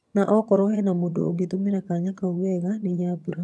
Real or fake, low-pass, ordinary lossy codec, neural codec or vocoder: fake; none; none; vocoder, 22.05 kHz, 80 mel bands, HiFi-GAN